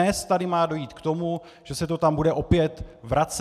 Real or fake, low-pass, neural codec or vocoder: real; 14.4 kHz; none